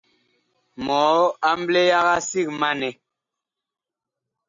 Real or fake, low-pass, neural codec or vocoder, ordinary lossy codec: real; 7.2 kHz; none; MP3, 48 kbps